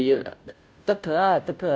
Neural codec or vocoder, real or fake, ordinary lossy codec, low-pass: codec, 16 kHz, 0.5 kbps, FunCodec, trained on Chinese and English, 25 frames a second; fake; none; none